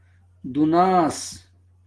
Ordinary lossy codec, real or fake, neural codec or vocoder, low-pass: Opus, 16 kbps; real; none; 10.8 kHz